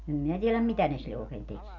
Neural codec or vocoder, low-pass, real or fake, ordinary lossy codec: none; 7.2 kHz; real; none